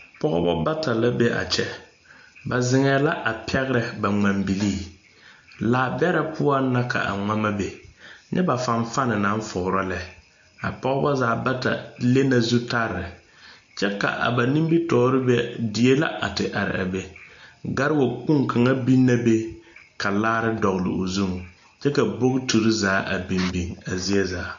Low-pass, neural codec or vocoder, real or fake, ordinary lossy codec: 7.2 kHz; none; real; AAC, 64 kbps